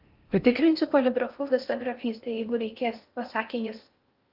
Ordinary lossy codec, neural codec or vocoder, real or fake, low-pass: Opus, 24 kbps; codec, 16 kHz in and 24 kHz out, 0.8 kbps, FocalCodec, streaming, 65536 codes; fake; 5.4 kHz